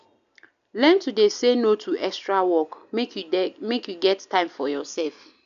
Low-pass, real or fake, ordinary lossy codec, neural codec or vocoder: 7.2 kHz; real; none; none